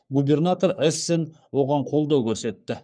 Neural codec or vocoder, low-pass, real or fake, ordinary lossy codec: codec, 44.1 kHz, 3.4 kbps, Pupu-Codec; 9.9 kHz; fake; none